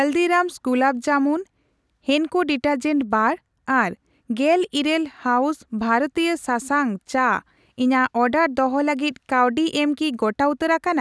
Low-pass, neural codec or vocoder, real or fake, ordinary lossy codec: none; none; real; none